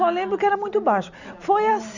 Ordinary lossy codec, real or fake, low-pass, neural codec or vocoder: none; real; 7.2 kHz; none